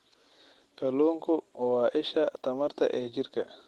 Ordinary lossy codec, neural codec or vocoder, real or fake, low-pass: Opus, 16 kbps; none; real; 19.8 kHz